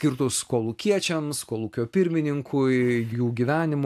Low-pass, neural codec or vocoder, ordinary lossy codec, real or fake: 14.4 kHz; none; AAC, 96 kbps; real